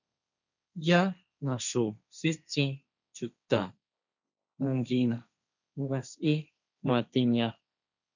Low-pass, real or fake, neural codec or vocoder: 7.2 kHz; fake; codec, 16 kHz, 1.1 kbps, Voila-Tokenizer